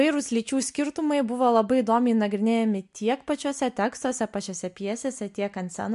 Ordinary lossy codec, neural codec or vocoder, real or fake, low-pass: MP3, 64 kbps; none; real; 10.8 kHz